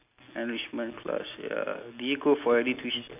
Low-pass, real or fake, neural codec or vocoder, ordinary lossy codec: 3.6 kHz; real; none; none